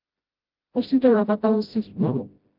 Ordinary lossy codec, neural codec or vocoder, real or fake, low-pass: Opus, 32 kbps; codec, 16 kHz, 0.5 kbps, FreqCodec, smaller model; fake; 5.4 kHz